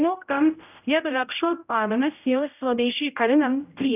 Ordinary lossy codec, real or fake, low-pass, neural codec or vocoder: none; fake; 3.6 kHz; codec, 16 kHz, 0.5 kbps, X-Codec, HuBERT features, trained on general audio